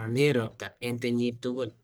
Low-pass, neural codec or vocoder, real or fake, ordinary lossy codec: none; codec, 44.1 kHz, 1.7 kbps, Pupu-Codec; fake; none